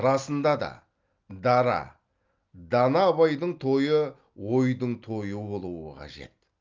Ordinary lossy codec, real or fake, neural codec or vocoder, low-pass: Opus, 24 kbps; real; none; 7.2 kHz